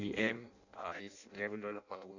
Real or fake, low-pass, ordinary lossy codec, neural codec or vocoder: fake; 7.2 kHz; none; codec, 16 kHz in and 24 kHz out, 0.6 kbps, FireRedTTS-2 codec